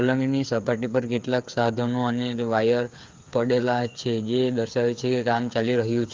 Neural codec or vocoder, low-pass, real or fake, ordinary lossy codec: codec, 16 kHz, 8 kbps, FreqCodec, smaller model; 7.2 kHz; fake; Opus, 32 kbps